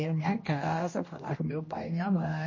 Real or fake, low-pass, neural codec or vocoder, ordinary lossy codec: fake; 7.2 kHz; codec, 16 kHz, 1 kbps, X-Codec, HuBERT features, trained on general audio; MP3, 32 kbps